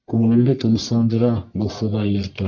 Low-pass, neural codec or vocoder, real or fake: 7.2 kHz; codec, 44.1 kHz, 1.7 kbps, Pupu-Codec; fake